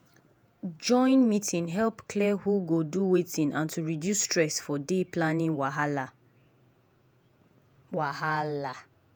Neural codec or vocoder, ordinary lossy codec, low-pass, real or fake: vocoder, 48 kHz, 128 mel bands, Vocos; none; none; fake